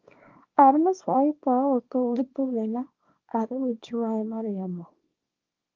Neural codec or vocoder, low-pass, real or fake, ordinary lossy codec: codec, 24 kHz, 0.9 kbps, WavTokenizer, small release; 7.2 kHz; fake; Opus, 24 kbps